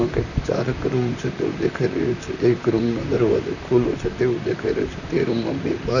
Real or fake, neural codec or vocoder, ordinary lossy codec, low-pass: fake; vocoder, 44.1 kHz, 128 mel bands, Pupu-Vocoder; none; 7.2 kHz